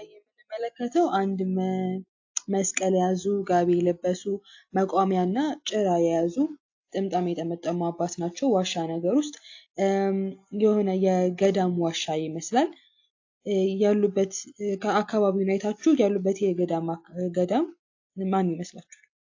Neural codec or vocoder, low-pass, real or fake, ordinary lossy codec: none; 7.2 kHz; real; AAC, 48 kbps